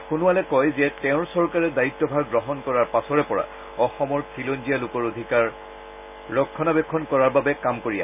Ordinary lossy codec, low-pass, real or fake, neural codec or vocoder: none; 3.6 kHz; real; none